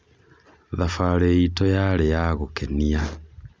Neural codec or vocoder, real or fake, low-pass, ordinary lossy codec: none; real; none; none